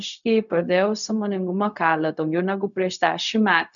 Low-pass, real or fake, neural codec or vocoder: 7.2 kHz; fake; codec, 16 kHz, 0.4 kbps, LongCat-Audio-Codec